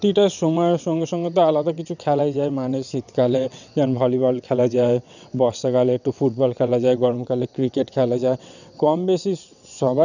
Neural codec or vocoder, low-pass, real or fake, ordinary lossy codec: vocoder, 22.05 kHz, 80 mel bands, WaveNeXt; 7.2 kHz; fake; none